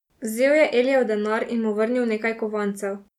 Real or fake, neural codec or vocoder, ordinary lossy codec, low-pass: real; none; none; 19.8 kHz